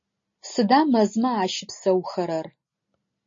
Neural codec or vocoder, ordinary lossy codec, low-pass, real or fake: none; MP3, 32 kbps; 7.2 kHz; real